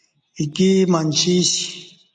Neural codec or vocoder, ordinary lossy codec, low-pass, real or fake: none; MP3, 64 kbps; 9.9 kHz; real